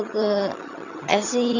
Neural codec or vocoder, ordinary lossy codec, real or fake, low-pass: vocoder, 22.05 kHz, 80 mel bands, HiFi-GAN; none; fake; 7.2 kHz